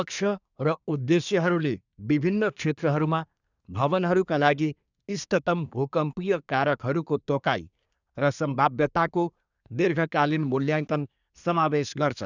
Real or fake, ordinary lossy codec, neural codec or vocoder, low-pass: fake; none; codec, 24 kHz, 1 kbps, SNAC; 7.2 kHz